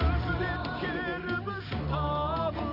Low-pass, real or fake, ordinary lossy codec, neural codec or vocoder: 5.4 kHz; real; none; none